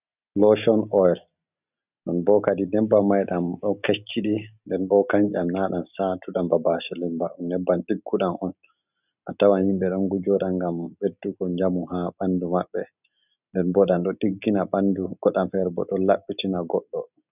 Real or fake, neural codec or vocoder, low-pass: real; none; 3.6 kHz